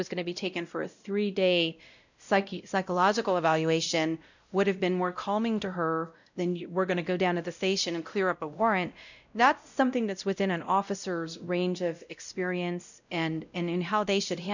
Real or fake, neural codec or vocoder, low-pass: fake; codec, 16 kHz, 0.5 kbps, X-Codec, WavLM features, trained on Multilingual LibriSpeech; 7.2 kHz